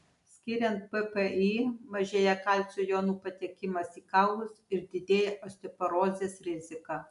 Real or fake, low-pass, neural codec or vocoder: real; 10.8 kHz; none